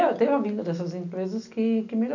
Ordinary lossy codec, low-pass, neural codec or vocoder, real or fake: none; 7.2 kHz; none; real